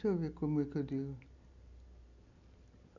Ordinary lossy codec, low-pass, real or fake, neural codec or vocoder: none; 7.2 kHz; real; none